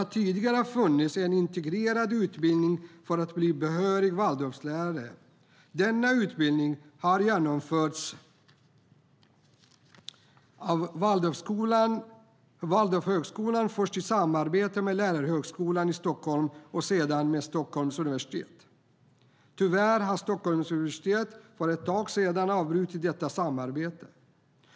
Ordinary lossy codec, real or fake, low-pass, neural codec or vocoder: none; real; none; none